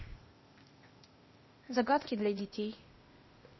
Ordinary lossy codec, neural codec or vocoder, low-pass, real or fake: MP3, 24 kbps; codec, 16 kHz, 0.8 kbps, ZipCodec; 7.2 kHz; fake